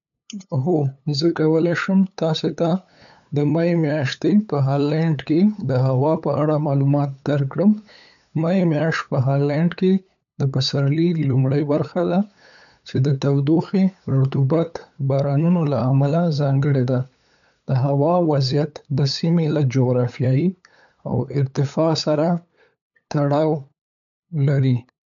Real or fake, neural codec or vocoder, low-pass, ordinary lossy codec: fake; codec, 16 kHz, 8 kbps, FunCodec, trained on LibriTTS, 25 frames a second; 7.2 kHz; none